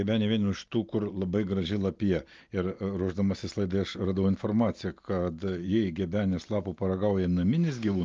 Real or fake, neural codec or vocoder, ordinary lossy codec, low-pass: real; none; Opus, 24 kbps; 7.2 kHz